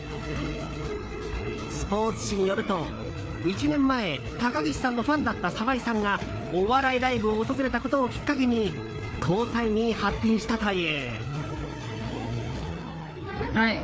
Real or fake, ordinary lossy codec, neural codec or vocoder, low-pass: fake; none; codec, 16 kHz, 4 kbps, FreqCodec, larger model; none